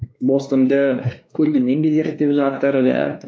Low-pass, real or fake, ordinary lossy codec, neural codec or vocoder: none; fake; none; codec, 16 kHz, 2 kbps, X-Codec, WavLM features, trained on Multilingual LibriSpeech